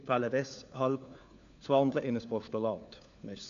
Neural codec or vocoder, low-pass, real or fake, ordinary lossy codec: codec, 16 kHz, 4 kbps, FunCodec, trained on LibriTTS, 50 frames a second; 7.2 kHz; fake; MP3, 96 kbps